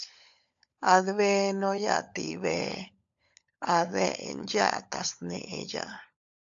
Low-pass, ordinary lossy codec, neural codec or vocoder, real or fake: 7.2 kHz; AAC, 64 kbps; codec, 16 kHz, 16 kbps, FunCodec, trained on LibriTTS, 50 frames a second; fake